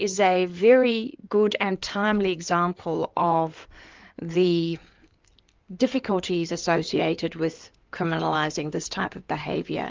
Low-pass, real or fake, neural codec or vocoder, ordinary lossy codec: 7.2 kHz; fake; codec, 16 kHz in and 24 kHz out, 2.2 kbps, FireRedTTS-2 codec; Opus, 24 kbps